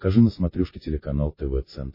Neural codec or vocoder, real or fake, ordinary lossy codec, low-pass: none; real; MP3, 24 kbps; 5.4 kHz